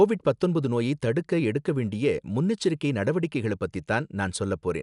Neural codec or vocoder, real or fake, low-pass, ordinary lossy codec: none; real; 10.8 kHz; none